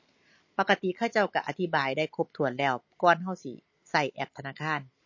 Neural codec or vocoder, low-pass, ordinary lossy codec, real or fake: none; 7.2 kHz; MP3, 32 kbps; real